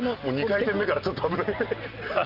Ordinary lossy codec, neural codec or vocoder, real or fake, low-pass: Opus, 24 kbps; none; real; 5.4 kHz